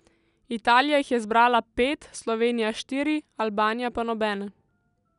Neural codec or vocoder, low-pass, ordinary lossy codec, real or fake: none; 10.8 kHz; none; real